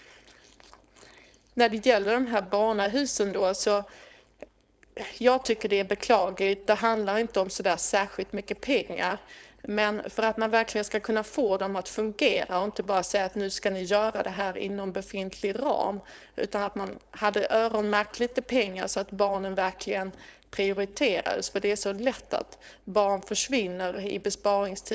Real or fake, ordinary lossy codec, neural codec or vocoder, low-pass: fake; none; codec, 16 kHz, 4.8 kbps, FACodec; none